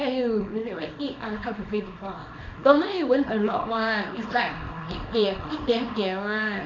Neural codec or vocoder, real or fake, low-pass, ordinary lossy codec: codec, 24 kHz, 0.9 kbps, WavTokenizer, small release; fake; 7.2 kHz; none